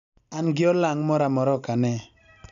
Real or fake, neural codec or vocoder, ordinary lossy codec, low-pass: real; none; none; 7.2 kHz